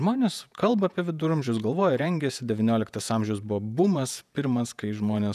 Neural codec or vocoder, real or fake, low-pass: vocoder, 48 kHz, 128 mel bands, Vocos; fake; 14.4 kHz